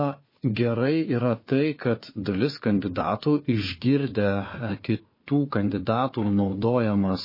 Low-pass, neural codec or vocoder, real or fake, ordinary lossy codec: 5.4 kHz; codec, 16 kHz, 4 kbps, FunCodec, trained on Chinese and English, 50 frames a second; fake; MP3, 24 kbps